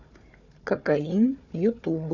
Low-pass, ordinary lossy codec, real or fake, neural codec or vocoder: 7.2 kHz; none; fake; codec, 16 kHz, 4 kbps, FunCodec, trained on Chinese and English, 50 frames a second